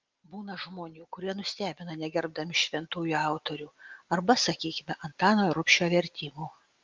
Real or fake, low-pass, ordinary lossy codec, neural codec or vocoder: real; 7.2 kHz; Opus, 32 kbps; none